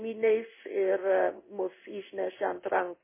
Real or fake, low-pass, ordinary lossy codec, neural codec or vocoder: fake; 3.6 kHz; MP3, 16 kbps; codec, 16 kHz in and 24 kHz out, 1 kbps, XY-Tokenizer